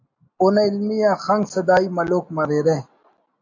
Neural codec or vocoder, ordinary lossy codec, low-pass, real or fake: none; AAC, 48 kbps; 7.2 kHz; real